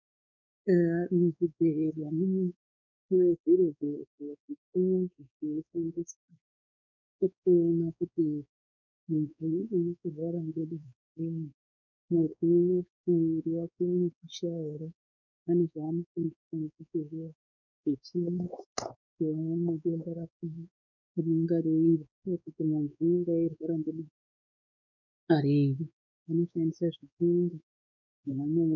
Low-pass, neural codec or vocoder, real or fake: 7.2 kHz; codec, 16 kHz, 4 kbps, X-Codec, WavLM features, trained on Multilingual LibriSpeech; fake